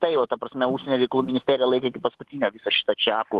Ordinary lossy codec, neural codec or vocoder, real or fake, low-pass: Opus, 16 kbps; autoencoder, 48 kHz, 128 numbers a frame, DAC-VAE, trained on Japanese speech; fake; 14.4 kHz